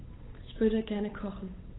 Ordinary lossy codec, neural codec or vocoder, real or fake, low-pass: AAC, 16 kbps; codec, 16 kHz, 8 kbps, FunCodec, trained on Chinese and English, 25 frames a second; fake; 7.2 kHz